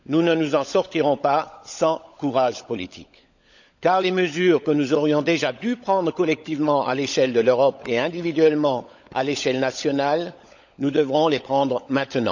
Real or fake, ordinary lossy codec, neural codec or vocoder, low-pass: fake; none; codec, 16 kHz, 16 kbps, FunCodec, trained on LibriTTS, 50 frames a second; 7.2 kHz